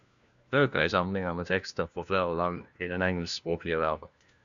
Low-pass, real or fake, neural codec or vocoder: 7.2 kHz; fake; codec, 16 kHz, 1 kbps, FunCodec, trained on LibriTTS, 50 frames a second